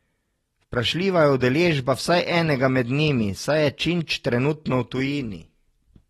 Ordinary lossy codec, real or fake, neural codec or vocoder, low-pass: AAC, 32 kbps; real; none; 19.8 kHz